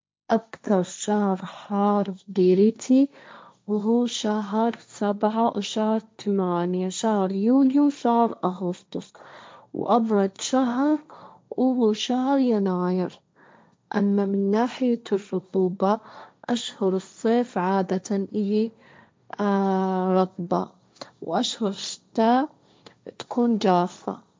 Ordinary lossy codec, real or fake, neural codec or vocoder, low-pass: none; fake; codec, 16 kHz, 1.1 kbps, Voila-Tokenizer; none